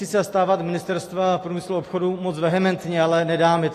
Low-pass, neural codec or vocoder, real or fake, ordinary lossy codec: 14.4 kHz; none; real; AAC, 48 kbps